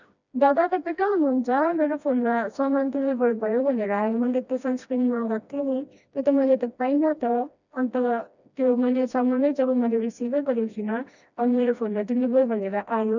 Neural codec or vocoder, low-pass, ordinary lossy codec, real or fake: codec, 16 kHz, 1 kbps, FreqCodec, smaller model; 7.2 kHz; none; fake